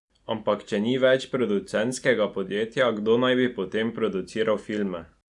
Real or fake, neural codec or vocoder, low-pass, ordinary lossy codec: real; none; 10.8 kHz; none